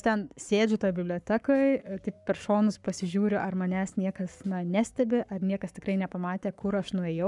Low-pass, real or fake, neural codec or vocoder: 10.8 kHz; fake; codec, 44.1 kHz, 7.8 kbps, Pupu-Codec